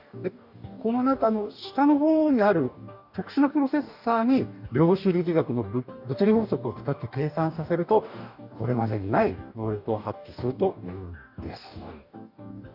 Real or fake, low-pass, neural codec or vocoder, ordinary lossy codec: fake; 5.4 kHz; codec, 44.1 kHz, 2.6 kbps, DAC; none